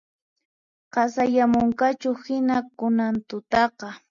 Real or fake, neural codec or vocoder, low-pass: real; none; 7.2 kHz